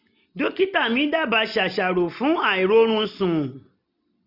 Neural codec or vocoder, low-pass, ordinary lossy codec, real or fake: none; 5.4 kHz; none; real